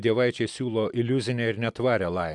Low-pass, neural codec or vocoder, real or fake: 10.8 kHz; none; real